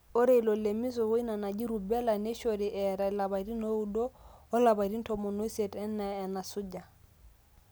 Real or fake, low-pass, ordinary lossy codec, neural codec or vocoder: real; none; none; none